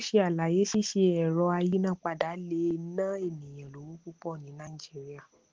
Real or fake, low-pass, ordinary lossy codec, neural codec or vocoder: real; 7.2 kHz; Opus, 16 kbps; none